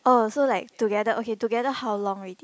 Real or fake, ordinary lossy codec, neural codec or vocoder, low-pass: real; none; none; none